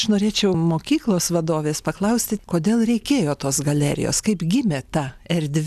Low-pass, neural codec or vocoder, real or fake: 14.4 kHz; none; real